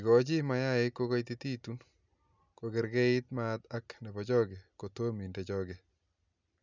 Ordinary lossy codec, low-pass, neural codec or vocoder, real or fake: none; 7.2 kHz; none; real